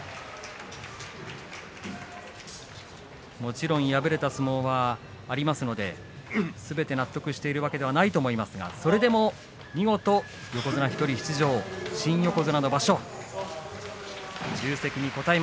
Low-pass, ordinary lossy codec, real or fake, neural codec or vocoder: none; none; real; none